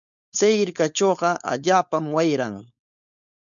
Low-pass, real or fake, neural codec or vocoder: 7.2 kHz; fake; codec, 16 kHz, 4.8 kbps, FACodec